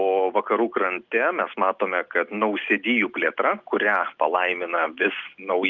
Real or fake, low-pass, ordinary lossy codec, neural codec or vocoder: real; 7.2 kHz; Opus, 24 kbps; none